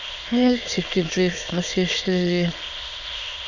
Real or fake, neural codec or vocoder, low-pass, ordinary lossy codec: fake; autoencoder, 22.05 kHz, a latent of 192 numbers a frame, VITS, trained on many speakers; 7.2 kHz; none